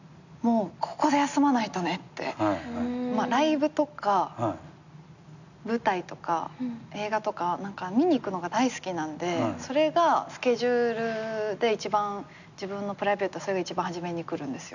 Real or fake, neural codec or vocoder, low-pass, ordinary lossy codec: real; none; 7.2 kHz; none